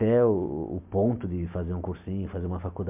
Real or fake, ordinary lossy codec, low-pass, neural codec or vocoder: real; MP3, 32 kbps; 3.6 kHz; none